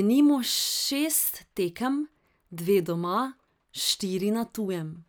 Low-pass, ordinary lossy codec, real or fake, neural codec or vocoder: none; none; real; none